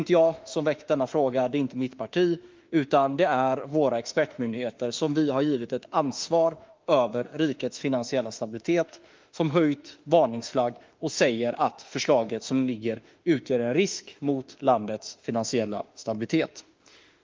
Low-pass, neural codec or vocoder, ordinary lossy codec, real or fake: 7.2 kHz; autoencoder, 48 kHz, 32 numbers a frame, DAC-VAE, trained on Japanese speech; Opus, 24 kbps; fake